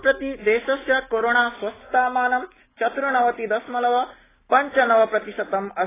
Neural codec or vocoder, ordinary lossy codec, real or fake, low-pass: none; AAC, 16 kbps; real; 3.6 kHz